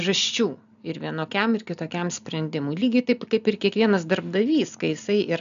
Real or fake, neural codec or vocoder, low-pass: real; none; 7.2 kHz